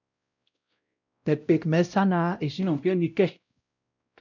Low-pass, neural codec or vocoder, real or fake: 7.2 kHz; codec, 16 kHz, 0.5 kbps, X-Codec, WavLM features, trained on Multilingual LibriSpeech; fake